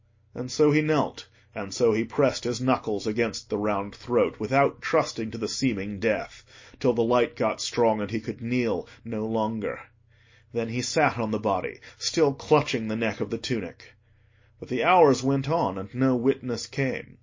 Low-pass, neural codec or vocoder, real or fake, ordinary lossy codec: 7.2 kHz; none; real; MP3, 32 kbps